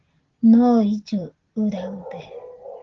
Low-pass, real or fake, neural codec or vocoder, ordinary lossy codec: 7.2 kHz; real; none; Opus, 16 kbps